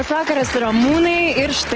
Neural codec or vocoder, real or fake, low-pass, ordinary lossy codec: none; real; 7.2 kHz; Opus, 16 kbps